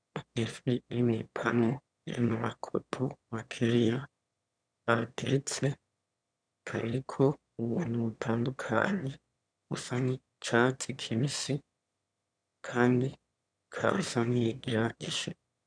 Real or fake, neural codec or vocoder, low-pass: fake; autoencoder, 22.05 kHz, a latent of 192 numbers a frame, VITS, trained on one speaker; 9.9 kHz